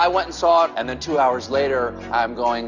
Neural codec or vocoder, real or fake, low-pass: none; real; 7.2 kHz